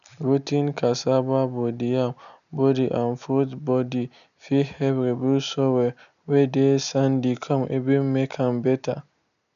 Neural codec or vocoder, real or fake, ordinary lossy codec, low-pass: none; real; none; 7.2 kHz